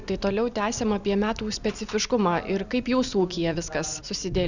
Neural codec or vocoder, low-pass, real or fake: none; 7.2 kHz; real